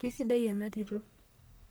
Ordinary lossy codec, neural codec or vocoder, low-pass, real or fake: none; codec, 44.1 kHz, 1.7 kbps, Pupu-Codec; none; fake